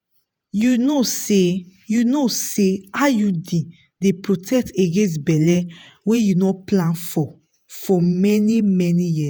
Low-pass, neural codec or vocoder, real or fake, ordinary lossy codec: none; vocoder, 48 kHz, 128 mel bands, Vocos; fake; none